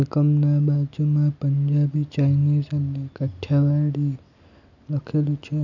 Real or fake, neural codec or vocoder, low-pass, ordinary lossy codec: real; none; 7.2 kHz; none